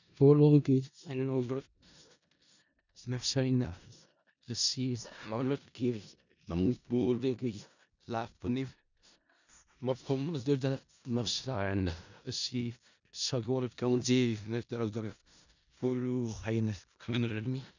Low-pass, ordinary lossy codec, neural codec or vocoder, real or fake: 7.2 kHz; none; codec, 16 kHz in and 24 kHz out, 0.4 kbps, LongCat-Audio-Codec, four codebook decoder; fake